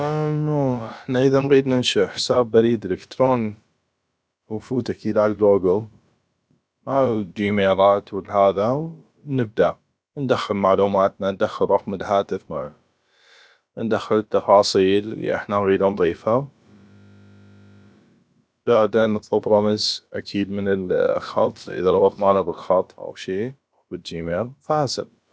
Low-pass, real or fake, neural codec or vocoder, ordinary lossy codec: none; fake; codec, 16 kHz, about 1 kbps, DyCAST, with the encoder's durations; none